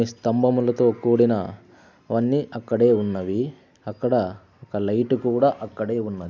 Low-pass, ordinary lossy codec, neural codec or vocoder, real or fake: 7.2 kHz; none; none; real